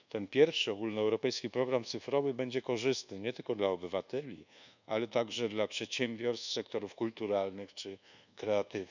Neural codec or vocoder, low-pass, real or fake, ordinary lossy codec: codec, 24 kHz, 1.2 kbps, DualCodec; 7.2 kHz; fake; none